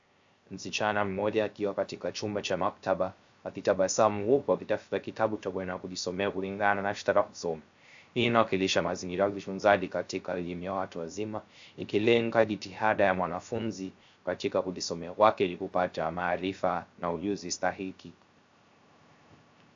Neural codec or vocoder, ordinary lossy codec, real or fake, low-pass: codec, 16 kHz, 0.3 kbps, FocalCodec; AAC, 64 kbps; fake; 7.2 kHz